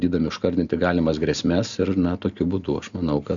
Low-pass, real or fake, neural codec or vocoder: 7.2 kHz; real; none